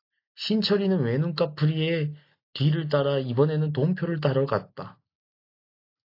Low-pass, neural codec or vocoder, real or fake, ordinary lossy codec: 5.4 kHz; none; real; AAC, 32 kbps